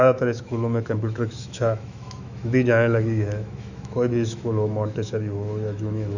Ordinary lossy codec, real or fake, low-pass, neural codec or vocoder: none; fake; 7.2 kHz; autoencoder, 48 kHz, 128 numbers a frame, DAC-VAE, trained on Japanese speech